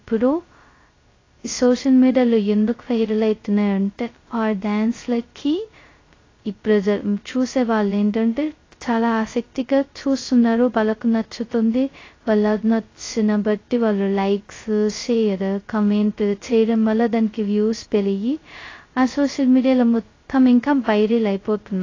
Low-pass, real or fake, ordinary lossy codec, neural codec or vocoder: 7.2 kHz; fake; AAC, 32 kbps; codec, 16 kHz, 0.2 kbps, FocalCodec